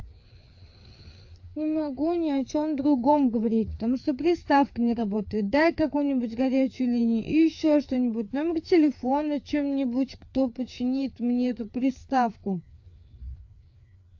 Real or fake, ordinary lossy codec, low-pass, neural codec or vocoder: fake; AAC, 48 kbps; 7.2 kHz; codec, 16 kHz, 4 kbps, FreqCodec, larger model